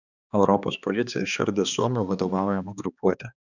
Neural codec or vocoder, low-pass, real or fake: codec, 16 kHz, 2 kbps, X-Codec, HuBERT features, trained on balanced general audio; 7.2 kHz; fake